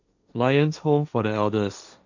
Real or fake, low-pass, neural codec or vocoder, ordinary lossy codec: fake; 7.2 kHz; codec, 16 kHz, 1.1 kbps, Voila-Tokenizer; none